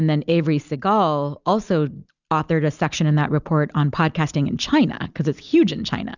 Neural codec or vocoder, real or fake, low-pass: none; real; 7.2 kHz